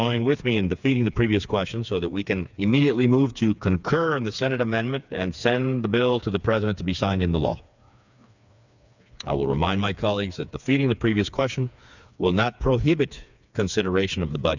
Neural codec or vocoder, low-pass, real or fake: codec, 16 kHz, 4 kbps, FreqCodec, smaller model; 7.2 kHz; fake